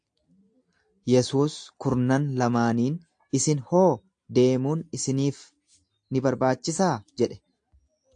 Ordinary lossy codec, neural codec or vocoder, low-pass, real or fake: AAC, 64 kbps; none; 9.9 kHz; real